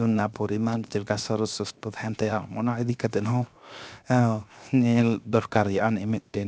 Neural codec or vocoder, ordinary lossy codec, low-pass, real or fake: codec, 16 kHz, 0.7 kbps, FocalCodec; none; none; fake